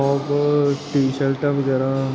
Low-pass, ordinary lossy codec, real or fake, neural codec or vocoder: none; none; real; none